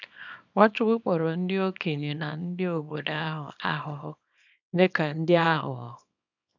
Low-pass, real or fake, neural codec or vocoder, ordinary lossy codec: 7.2 kHz; fake; codec, 24 kHz, 0.9 kbps, WavTokenizer, small release; none